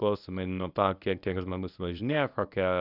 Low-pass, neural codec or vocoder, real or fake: 5.4 kHz; codec, 24 kHz, 0.9 kbps, WavTokenizer, medium speech release version 1; fake